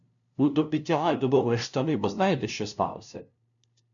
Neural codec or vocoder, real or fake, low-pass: codec, 16 kHz, 0.5 kbps, FunCodec, trained on LibriTTS, 25 frames a second; fake; 7.2 kHz